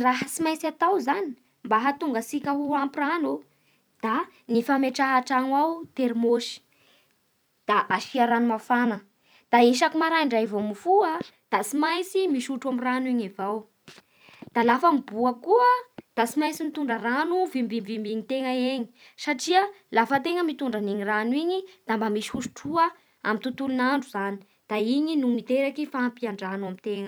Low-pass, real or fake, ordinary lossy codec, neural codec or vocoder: none; fake; none; vocoder, 44.1 kHz, 128 mel bands every 256 samples, BigVGAN v2